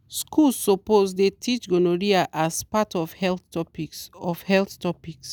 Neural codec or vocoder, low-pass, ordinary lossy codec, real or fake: none; none; none; real